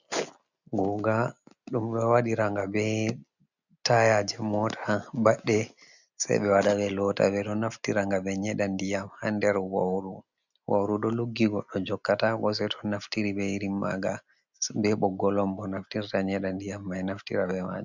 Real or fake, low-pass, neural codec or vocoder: real; 7.2 kHz; none